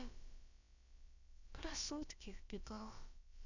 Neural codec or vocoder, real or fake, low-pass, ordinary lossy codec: codec, 16 kHz, about 1 kbps, DyCAST, with the encoder's durations; fake; 7.2 kHz; none